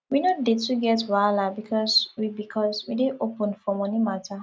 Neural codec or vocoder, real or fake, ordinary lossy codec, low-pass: none; real; none; none